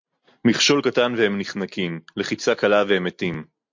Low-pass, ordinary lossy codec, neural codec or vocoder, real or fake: 7.2 kHz; MP3, 48 kbps; none; real